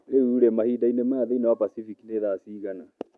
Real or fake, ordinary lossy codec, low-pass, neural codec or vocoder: real; none; none; none